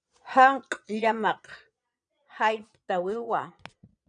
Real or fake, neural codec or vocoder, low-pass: fake; vocoder, 22.05 kHz, 80 mel bands, Vocos; 9.9 kHz